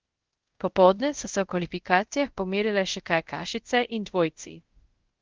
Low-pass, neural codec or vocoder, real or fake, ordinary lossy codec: 7.2 kHz; codec, 24 kHz, 0.5 kbps, DualCodec; fake; Opus, 16 kbps